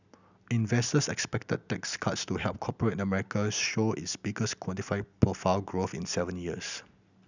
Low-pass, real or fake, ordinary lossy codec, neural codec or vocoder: 7.2 kHz; real; none; none